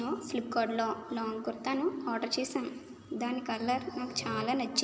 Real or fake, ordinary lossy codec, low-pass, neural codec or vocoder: real; none; none; none